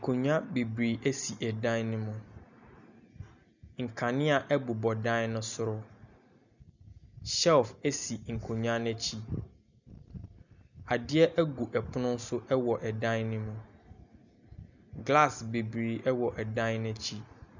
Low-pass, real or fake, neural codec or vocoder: 7.2 kHz; real; none